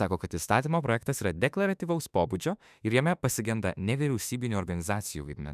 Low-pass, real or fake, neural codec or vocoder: 14.4 kHz; fake; autoencoder, 48 kHz, 32 numbers a frame, DAC-VAE, trained on Japanese speech